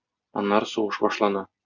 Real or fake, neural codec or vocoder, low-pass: real; none; 7.2 kHz